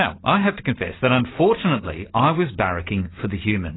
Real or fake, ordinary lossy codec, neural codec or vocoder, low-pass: real; AAC, 16 kbps; none; 7.2 kHz